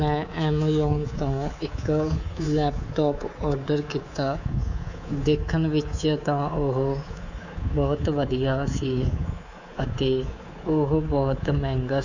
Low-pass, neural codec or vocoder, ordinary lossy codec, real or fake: 7.2 kHz; codec, 24 kHz, 3.1 kbps, DualCodec; none; fake